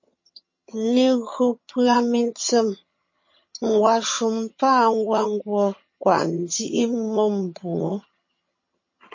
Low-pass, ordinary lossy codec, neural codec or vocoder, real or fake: 7.2 kHz; MP3, 32 kbps; vocoder, 22.05 kHz, 80 mel bands, HiFi-GAN; fake